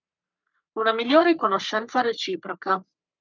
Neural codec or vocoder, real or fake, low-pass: codec, 44.1 kHz, 3.4 kbps, Pupu-Codec; fake; 7.2 kHz